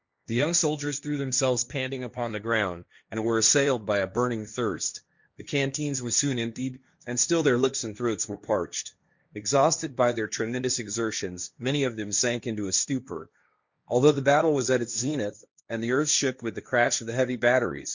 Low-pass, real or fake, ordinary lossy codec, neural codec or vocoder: 7.2 kHz; fake; Opus, 64 kbps; codec, 16 kHz, 1.1 kbps, Voila-Tokenizer